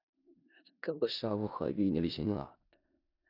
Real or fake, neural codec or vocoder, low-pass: fake; codec, 16 kHz in and 24 kHz out, 0.4 kbps, LongCat-Audio-Codec, four codebook decoder; 5.4 kHz